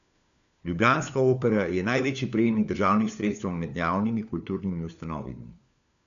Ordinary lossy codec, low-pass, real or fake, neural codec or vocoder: none; 7.2 kHz; fake; codec, 16 kHz, 4 kbps, FunCodec, trained on LibriTTS, 50 frames a second